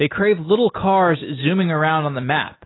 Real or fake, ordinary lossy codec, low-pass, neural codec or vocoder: real; AAC, 16 kbps; 7.2 kHz; none